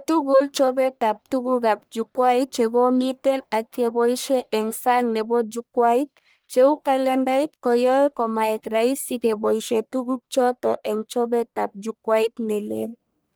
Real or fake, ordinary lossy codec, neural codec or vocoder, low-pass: fake; none; codec, 44.1 kHz, 1.7 kbps, Pupu-Codec; none